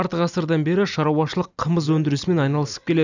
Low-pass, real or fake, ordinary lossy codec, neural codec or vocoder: 7.2 kHz; real; none; none